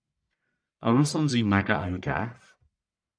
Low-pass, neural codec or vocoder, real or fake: 9.9 kHz; codec, 44.1 kHz, 1.7 kbps, Pupu-Codec; fake